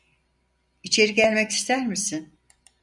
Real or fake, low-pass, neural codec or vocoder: real; 10.8 kHz; none